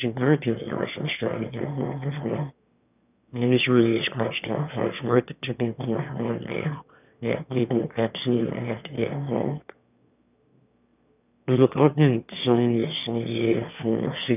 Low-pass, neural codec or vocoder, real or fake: 3.6 kHz; autoencoder, 22.05 kHz, a latent of 192 numbers a frame, VITS, trained on one speaker; fake